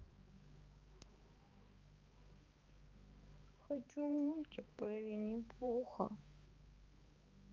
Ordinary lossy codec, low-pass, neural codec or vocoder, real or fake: Opus, 32 kbps; 7.2 kHz; codec, 16 kHz, 2 kbps, X-Codec, HuBERT features, trained on balanced general audio; fake